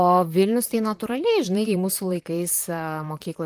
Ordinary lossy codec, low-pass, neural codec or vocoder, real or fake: Opus, 24 kbps; 14.4 kHz; vocoder, 44.1 kHz, 128 mel bands, Pupu-Vocoder; fake